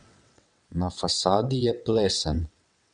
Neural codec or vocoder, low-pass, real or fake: vocoder, 22.05 kHz, 80 mel bands, WaveNeXt; 9.9 kHz; fake